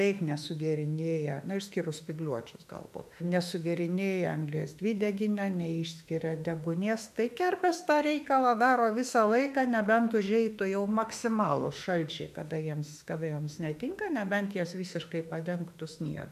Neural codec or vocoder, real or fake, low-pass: autoencoder, 48 kHz, 32 numbers a frame, DAC-VAE, trained on Japanese speech; fake; 14.4 kHz